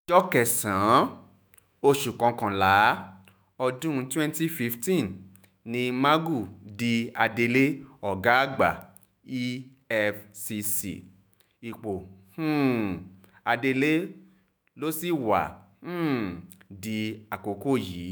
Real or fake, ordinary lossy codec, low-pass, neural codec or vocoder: fake; none; none; autoencoder, 48 kHz, 128 numbers a frame, DAC-VAE, trained on Japanese speech